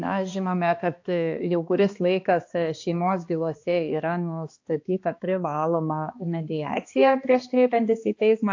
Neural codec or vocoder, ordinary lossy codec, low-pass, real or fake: codec, 16 kHz, 2 kbps, X-Codec, HuBERT features, trained on balanced general audio; AAC, 48 kbps; 7.2 kHz; fake